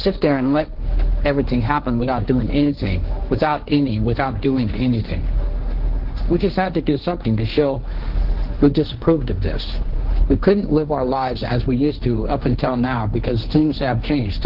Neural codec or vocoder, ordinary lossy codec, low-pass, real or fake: codec, 16 kHz, 1.1 kbps, Voila-Tokenizer; Opus, 16 kbps; 5.4 kHz; fake